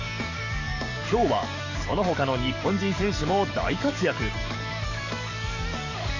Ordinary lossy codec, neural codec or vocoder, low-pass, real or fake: none; codec, 44.1 kHz, 7.8 kbps, DAC; 7.2 kHz; fake